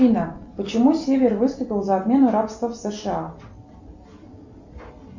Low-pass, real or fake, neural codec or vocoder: 7.2 kHz; real; none